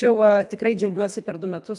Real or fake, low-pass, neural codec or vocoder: fake; 10.8 kHz; codec, 24 kHz, 1.5 kbps, HILCodec